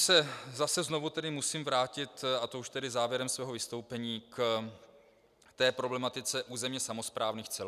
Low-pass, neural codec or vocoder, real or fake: 14.4 kHz; none; real